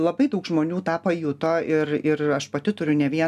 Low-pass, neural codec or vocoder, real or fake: 14.4 kHz; none; real